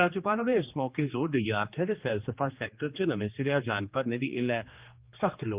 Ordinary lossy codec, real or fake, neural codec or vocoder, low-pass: Opus, 24 kbps; fake; codec, 16 kHz, 2 kbps, X-Codec, HuBERT features, trained on general audio; 3.6 kHz